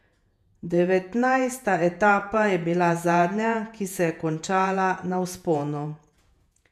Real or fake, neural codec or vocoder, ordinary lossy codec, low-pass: fake; vocoder, 48 kHz, 128 mel bands, Vocos; none; 14.4 kHz